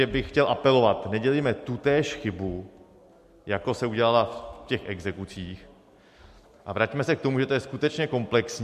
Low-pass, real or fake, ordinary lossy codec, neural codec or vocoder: 14.4 kHz; real; MP3, 64 kbps; none